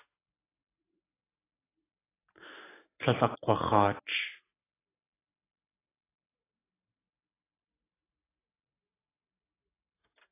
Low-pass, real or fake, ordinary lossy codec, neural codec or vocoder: 3.6 kHz; real; AAC, 16 kbps; none